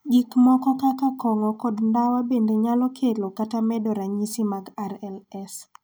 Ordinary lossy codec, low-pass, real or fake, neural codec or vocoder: none; none; real; none